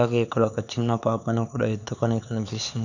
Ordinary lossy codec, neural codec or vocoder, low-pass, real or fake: none; codec, 16 kHz, 4 kbps, X-Codec, HuBERT features, trained on LibriSpeech; 7.2 kHz; fake